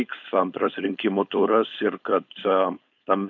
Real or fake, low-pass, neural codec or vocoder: fake; 7.2 kHz; codec, 16 kHz, 4.8 kbps, FACodec